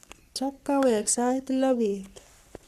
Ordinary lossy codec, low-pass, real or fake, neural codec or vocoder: none; 14.4 kHz; fake; codec, 44.1 kHz, 3.4 kbps, Pupu-Codec